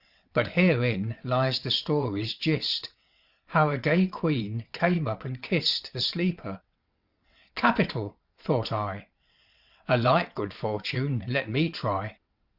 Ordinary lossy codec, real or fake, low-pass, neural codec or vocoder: Opus, 64 kbps; fake; 5.4 kHz; vocoder, 22.05 kHz, 80 mel bands, WaveNeXt